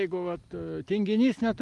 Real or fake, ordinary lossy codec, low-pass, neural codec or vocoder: real; Opus, 24 kbps; 10.8 kHz; none